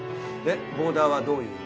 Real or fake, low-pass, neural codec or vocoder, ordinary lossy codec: real; none; none; none